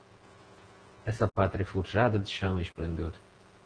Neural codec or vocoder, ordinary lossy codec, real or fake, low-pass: vocoder, 48 kHz, 128 mel bands, Vocos; Opus, 16 kbps; fake; 9.9 kHz